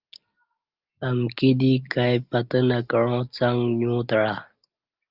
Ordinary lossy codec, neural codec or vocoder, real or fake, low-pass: Opus, 32 kbps; none; real; 5.4 kHz